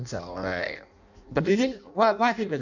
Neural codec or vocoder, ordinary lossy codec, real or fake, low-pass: codec, 16 kHz in and 24 kHz out, 0.6 kbps, FireRedTTS-2 codec; none; fake; 7.2 kHz